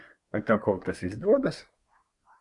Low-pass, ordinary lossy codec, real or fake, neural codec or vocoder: 10.8 kHz; AAC, 64 kbps; fake; codec, 24 kHz, 1 kbps, SNAC